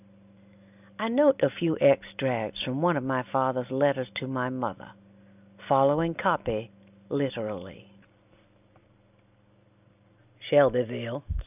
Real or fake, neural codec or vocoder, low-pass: real; none; 3.6 kHz